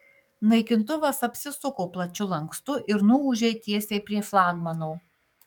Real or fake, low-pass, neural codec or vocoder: fake; 19.8 kHz; codec, 44.1 kHz, 7.8 kbps, DAC